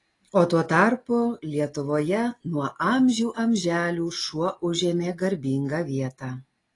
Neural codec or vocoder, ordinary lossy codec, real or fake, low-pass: none; AAC, 32 kbps; real; 10.8 kHz